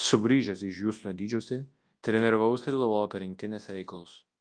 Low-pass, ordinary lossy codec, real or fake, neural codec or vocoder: 9.9 kHz; Opus, 24 kbps; fake; codec, 24 kHz, 0.9 kbps, WavTokenizer, large speech release